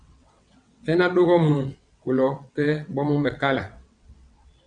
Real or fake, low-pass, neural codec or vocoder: fake; 9.9 kHz; vocoder, 22.05 kHz, 80 mel bands, WaveNeXt